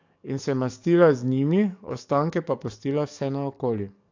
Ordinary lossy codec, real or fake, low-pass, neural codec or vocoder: AAC, 48 kbps; fake; 7.2 kHz; codec, 44.1 kHz, 7.8 kbps, DAC